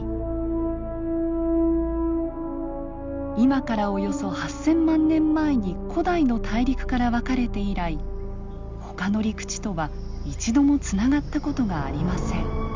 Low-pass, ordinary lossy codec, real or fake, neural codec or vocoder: 7.2 kHz; Opus, 32 kbps; real; none